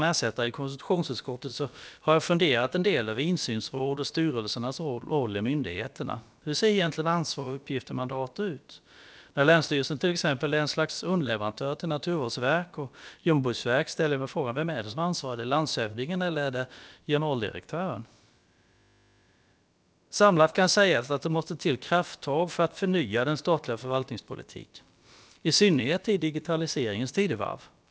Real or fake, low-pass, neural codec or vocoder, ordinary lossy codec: fake; none; codec, 16 kHz, about 1 kbps, DyCAST, with the encoder's durations; none